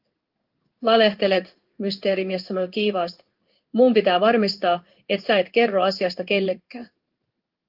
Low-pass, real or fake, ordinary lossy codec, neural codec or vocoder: 5.4 kHz; fake; Opus, 32 kbps; codec, 16 kHz in and 24 kHz out, 1 kbps, XY-Tokenizer